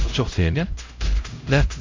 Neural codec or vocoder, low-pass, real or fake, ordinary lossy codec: codec, 16 kHz, 0.5 kbps, X-Codec, HuBERT features, trained on LibriSpeech; 7.2 kHz; fake; MP3, 64 kbps